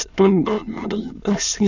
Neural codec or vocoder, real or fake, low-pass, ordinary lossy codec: autoencoder, 22.05 kHz, a latent of 192 numbers a frame, VITS, trained on many speakers; fake; 7.2 kHz; none